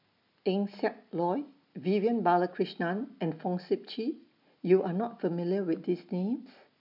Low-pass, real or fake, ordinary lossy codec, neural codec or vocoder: 5.4 kHz; real; none; none